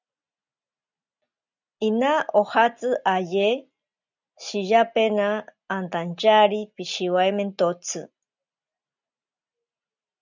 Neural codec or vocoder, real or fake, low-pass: none; real; 7.2 kHz